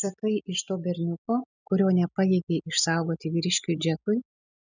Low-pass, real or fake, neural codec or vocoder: 7.2 kHz; real; none